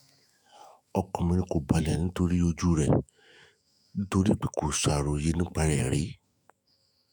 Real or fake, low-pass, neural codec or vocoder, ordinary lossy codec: fake; none; autoencoder, 48 kHz, 128 numbers a frame, DAC-VAE, trained on Japanese speech; none